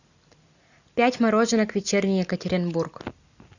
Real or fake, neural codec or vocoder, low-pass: real; none; 7.2 kHz